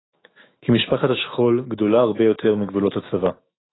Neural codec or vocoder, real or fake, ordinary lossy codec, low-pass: autoencoder, 48 kHz, 128 numbers a frame, DAC-VAE, trained on Japanese speech; fake; AAC, 16 kbps; 7.2 kHz